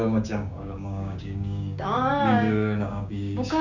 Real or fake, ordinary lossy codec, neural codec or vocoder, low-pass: fake; none; codec, 16 kHz, 6 kbps, DAC; 7.2 kHz